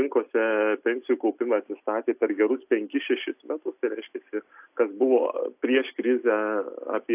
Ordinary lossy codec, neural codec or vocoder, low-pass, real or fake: AAC, 32 kbps; none; 3.6 kHz; real